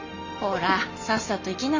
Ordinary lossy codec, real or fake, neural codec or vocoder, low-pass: MP3, 64 kbps; real; none; 7.2 kHz